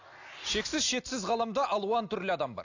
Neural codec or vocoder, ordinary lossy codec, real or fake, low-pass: none; AAC, 48 kbps; real; 7.2 kHz